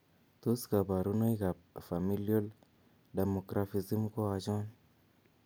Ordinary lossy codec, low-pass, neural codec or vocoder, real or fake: none; none; none; real